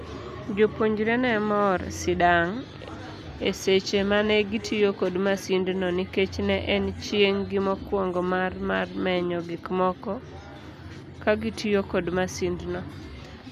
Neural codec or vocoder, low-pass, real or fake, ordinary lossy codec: none; 14.4 kHz; real; none